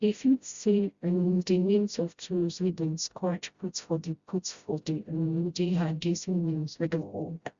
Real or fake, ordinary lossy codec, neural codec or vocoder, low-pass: fake; Opus, 64 kbps; codec, 16 kHz, 0.5 kbps, FreqCodec, smaller model; 7.2 kHz